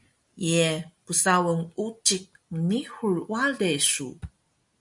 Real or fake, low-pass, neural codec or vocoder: real; 10.8 kHz; none